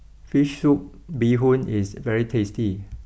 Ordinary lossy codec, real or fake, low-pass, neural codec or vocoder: none; real; none; none